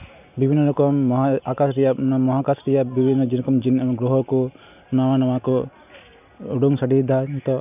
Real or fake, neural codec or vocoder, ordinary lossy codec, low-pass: real; none; none; 3.6 kHz